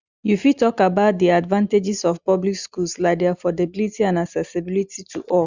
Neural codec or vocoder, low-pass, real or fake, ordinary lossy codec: none; 7.2 kHz; real; Opus, 64 kbps